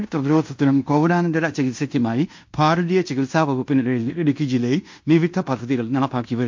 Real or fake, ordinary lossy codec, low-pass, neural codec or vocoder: fake; MP3, 48 kbps; 7.2 kHz; codec, 16 kHz in and 24 kHz out, 0.9 kbps, LongCat-Audio-Codec, fine tuned four codebook decoder